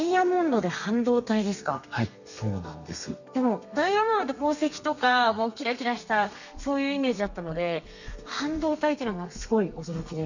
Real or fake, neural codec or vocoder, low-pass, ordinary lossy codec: fake; codec, 32 kHz, 1.9 kbps, SNAC; 7.2 kHz; none